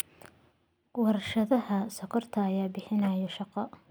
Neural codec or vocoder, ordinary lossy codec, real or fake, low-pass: none; none; real; none